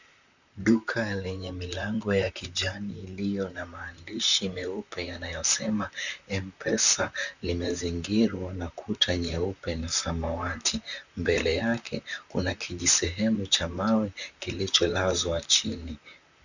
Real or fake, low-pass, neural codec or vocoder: fake; 7.2 kHz; vocoder, 44.1 kHz, 128 mel bands, Pupu-Vocoder